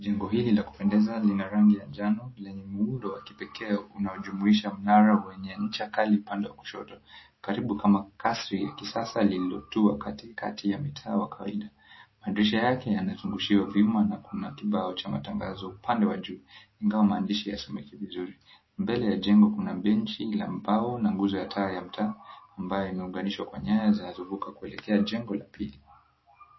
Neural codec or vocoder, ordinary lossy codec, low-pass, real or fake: none; MP3, 24 kbps; 7.2 kHz; real